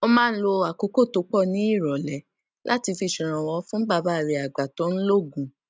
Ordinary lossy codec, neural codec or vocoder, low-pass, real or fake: none; none; none; real